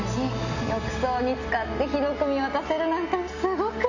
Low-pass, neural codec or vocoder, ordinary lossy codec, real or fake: 7.2 kHz; none; none; real